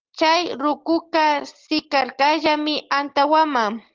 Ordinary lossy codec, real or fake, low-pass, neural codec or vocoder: Opus, 16 kbps; real; 7.2 kHz; none